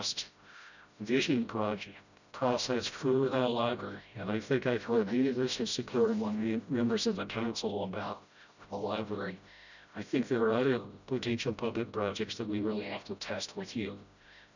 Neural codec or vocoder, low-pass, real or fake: codec, 16 kHz, 0.5 kbps, FreqCodec, smaller model; 7.2 kHz; fake